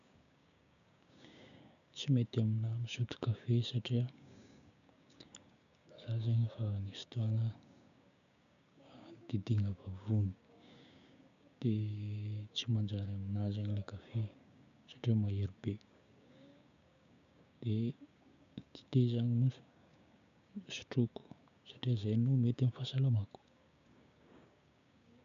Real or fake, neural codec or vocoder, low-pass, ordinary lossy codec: fake; codec, 16 kHz, 6 kbps, DAC; 7.2 kHz; none